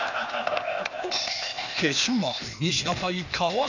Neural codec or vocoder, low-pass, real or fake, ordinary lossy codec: codec, 16 kHz, 0.8 kbps, ZipCodec; 7.2 kHz; fake; none